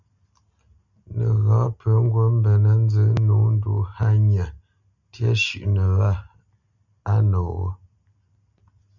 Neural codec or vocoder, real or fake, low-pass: none; real; 7.2 kHz